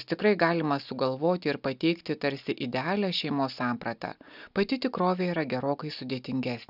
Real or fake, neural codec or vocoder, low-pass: real; none; 5.4 kHz